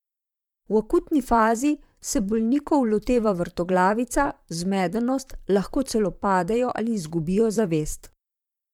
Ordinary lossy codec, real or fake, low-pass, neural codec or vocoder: MP3, 96 kbps; fake; 19.8 kHz; vocoder, 44.1 kHz, 128 mel bands, Pupu-Vocoder